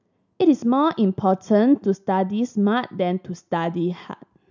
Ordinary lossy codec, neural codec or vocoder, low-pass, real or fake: none; none; 7.2 kHz; real